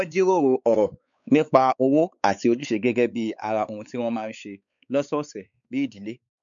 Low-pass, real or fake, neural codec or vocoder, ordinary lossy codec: 7.2 kHz; fake; codec, 16 kHz, 4 kbps, X-Codec, WavLM features, trained on Multilingual LibriSpeech; none